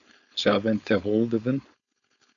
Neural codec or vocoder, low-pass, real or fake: codec, 16 kHz, 4.8 kbps, FACodec; 7.2 kHz; fake